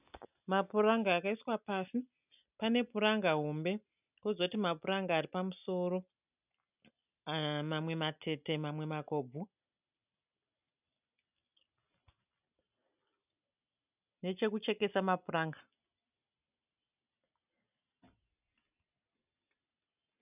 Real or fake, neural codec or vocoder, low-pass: real; none; 3.6 kHz